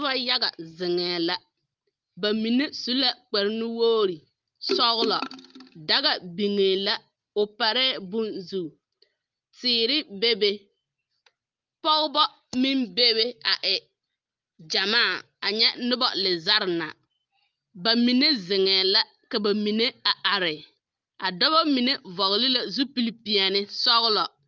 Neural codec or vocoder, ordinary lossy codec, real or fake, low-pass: none; Opus, 32 kbps; real; 7.2 kHz